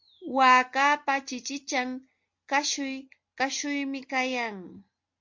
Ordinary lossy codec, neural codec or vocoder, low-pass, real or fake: MP3, 48 kbps; none; 7.2 kHz; real